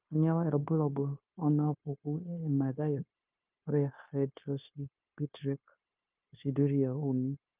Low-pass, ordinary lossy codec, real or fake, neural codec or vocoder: 3.6 kHz; Opus, 32 kbps; fake; codec, 16 kHz, 0.9 kbps, LongCat-Audio-Codec